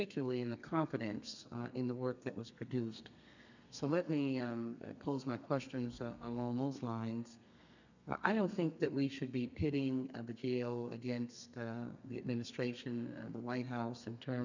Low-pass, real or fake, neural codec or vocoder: 7.2 kHz; fake; codec, 32 kHz, 1.9 kbps, SNAC